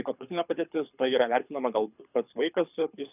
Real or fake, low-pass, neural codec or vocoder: fake; 3.6 kHz; codec, 24 kHz, 6 kbps, HILCodec